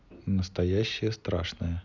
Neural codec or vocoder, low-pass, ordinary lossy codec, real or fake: none; 7.2 kHz; none; real